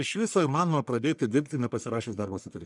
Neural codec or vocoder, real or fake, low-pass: codec, 44.1 kHz, 1.7 kbps, Pupu-Codec; fake; 10.8 kHz